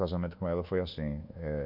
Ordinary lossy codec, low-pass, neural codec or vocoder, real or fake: none; 5.4 kHz; codec, 24 kHz, 1.2 kbps, DualCodec; fake